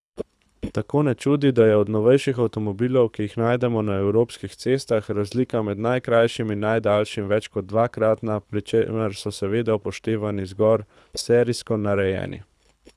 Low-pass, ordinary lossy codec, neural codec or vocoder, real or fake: none; none; codec, 24 kHz, 6 kbps, HILCodec; fake